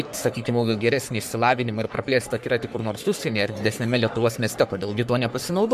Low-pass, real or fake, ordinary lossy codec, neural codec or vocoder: 14.4 kHz; fake; MP3, 96 kbps; codec, 44.1 kHz, 3.4 kbps, Pupu-Codec